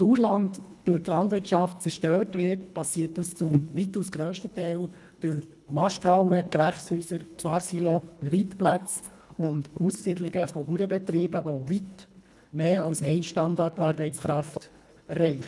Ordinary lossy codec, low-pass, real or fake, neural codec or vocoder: none; none; fake; codec, 24 kHz, 1.5 kbps, HILCodec